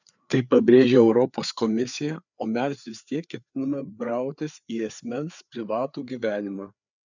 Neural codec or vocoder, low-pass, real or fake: codec, 16 kHz, 4 kbps, FreqCodec, larger model; 7.2 kHz; fake